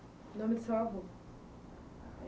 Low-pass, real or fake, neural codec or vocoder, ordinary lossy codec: none; real; none; none